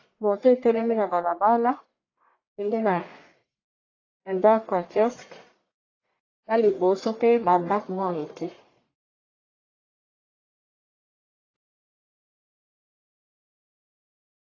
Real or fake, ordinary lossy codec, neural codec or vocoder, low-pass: fake; AAC, 32 kbps; codec, 44.1 kHz, 1.7 kbps, Pupu-Codec; 7.2 kHz